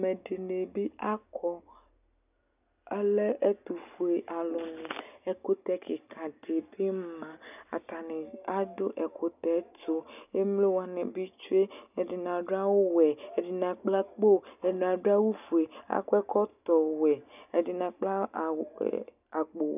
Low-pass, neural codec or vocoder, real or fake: 3.6 kHz; none; real